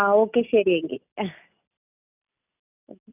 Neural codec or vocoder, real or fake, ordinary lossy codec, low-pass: none; real; none; 3.6 kHz